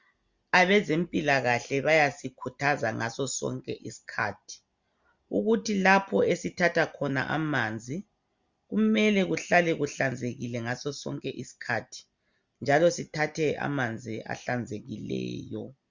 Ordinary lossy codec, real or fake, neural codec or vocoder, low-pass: Opus, 64 kbps; real; none; 7.2 kHz